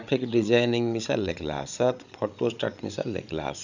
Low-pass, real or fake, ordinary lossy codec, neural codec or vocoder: 7.2 kHz; fake; none; codec, 16 kHz, 16 kbps, FreqCodec, larger model